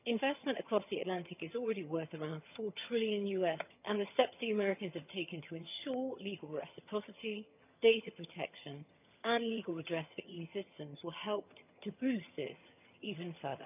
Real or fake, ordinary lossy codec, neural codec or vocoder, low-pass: fake; none; vocoder, 22.05 kHz, 80 mel bands, HiFi-GAN; 3.6 kHz